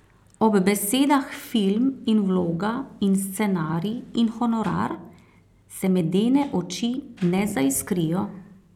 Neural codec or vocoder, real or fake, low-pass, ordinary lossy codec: none; real; 19.8 kHz; none